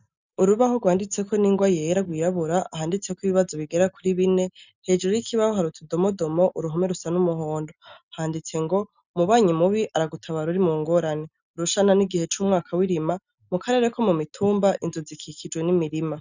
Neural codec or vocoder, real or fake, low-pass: none; real; 7.2 kHz